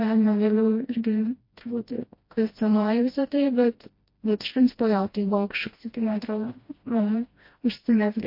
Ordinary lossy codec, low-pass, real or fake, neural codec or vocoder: MP3, 32 kbps; 5.4 kHz; fake; codec, 16 kHz, 1 kbps, FreqCodec, smaller model